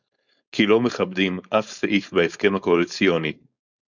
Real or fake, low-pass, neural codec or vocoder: fake; 7.2 kHz; codec, 16 kHz, 4.8 kbps, FACodec